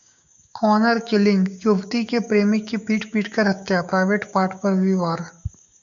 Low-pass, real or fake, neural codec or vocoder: 7.2 kHz; fake; codec, 16 kHz, 6 kbps, DAC